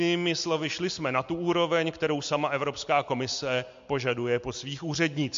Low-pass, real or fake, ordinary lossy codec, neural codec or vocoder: 7.2 kHz; real; MP3, 48 kbps; none